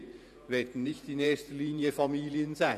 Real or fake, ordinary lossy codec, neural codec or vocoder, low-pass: fake; none; vocoder, 48 kHz, 128 mel bands, Vocos; 14.4 kHz